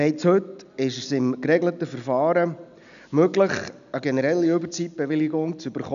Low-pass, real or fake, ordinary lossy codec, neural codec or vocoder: 7.2 kHz; real; none; none